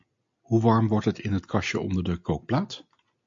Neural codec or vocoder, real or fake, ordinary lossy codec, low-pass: none; real; MP3, 48 kbps; 7.2 kHz